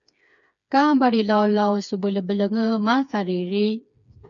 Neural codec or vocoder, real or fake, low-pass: codec, 16 kHz, 4 kbps, FreqCodec, smaller model; fake; 7.2 kHz